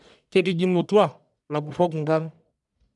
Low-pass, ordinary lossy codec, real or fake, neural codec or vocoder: 10.8 kHz; none; fake; codec, 44.1 kHz, 1.7 kbps, Pupu-Codec